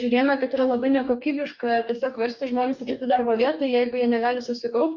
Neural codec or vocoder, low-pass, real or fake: codec, 44.1 kHz, 2.6 kbps, DAC; 7.2 kHz; fake